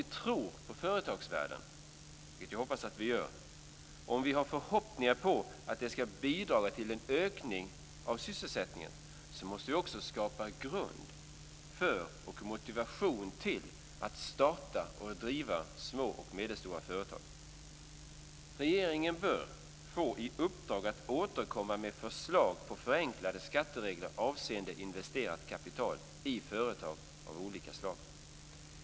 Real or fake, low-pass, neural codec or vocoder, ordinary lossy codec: real; none; none; none